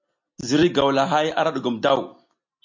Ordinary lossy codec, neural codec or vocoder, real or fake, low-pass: MP3, 48 kbps; none; real; 7.2 kHz